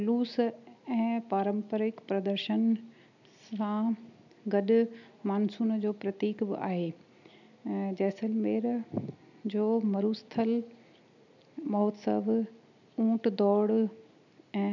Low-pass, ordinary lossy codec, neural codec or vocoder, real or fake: 7.2 kHz; none; none; real